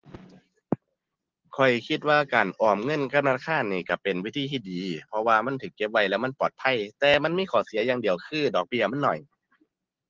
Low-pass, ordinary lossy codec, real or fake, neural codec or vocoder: 7.2 kHz; Opus, 16 kbps; real; none